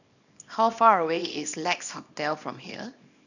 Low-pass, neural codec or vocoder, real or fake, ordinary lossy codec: 7.2 kHz; codec, 24 kHz, 0.9 kbps, WavTokenizer, small release; fake; none